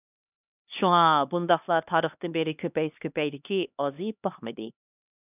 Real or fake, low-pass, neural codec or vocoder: fake; 3.6 kHz; codec, 16 kHz, 2 kbps, X-Codec, HuBERT features, trained on LibriSpeech